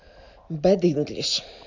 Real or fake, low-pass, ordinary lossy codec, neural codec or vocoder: real; 7.2 kHz; MP3, 64 kbps; none